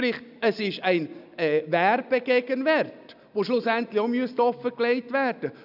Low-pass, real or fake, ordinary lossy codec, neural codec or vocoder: 5.4 kHz; real; none; none